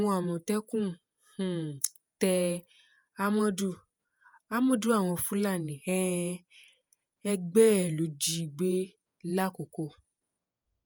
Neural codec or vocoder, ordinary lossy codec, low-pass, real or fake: vocoder, 48 kHz, 128 mel bands, Vocos; none; none; fake